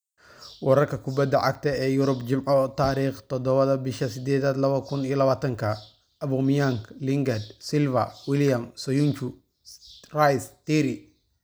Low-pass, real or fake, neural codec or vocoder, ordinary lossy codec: none; real; none; none